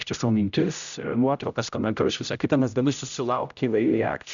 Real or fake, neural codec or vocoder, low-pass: fake; codec, 16 kHz, 0.5 kbps, X-Codec, HuBERT features, trained on general audio; 7.2 kHz